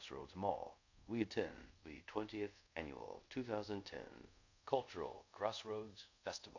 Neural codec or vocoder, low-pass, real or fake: codec, 24 kHz, 0.5 kbps, DualCodec; 7.2 kHz; fake